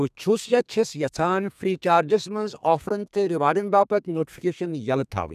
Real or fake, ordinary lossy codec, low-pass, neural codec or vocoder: fake; none; 14.4 kHz; codec, 32 kHz, 1.9 kbps, SNAC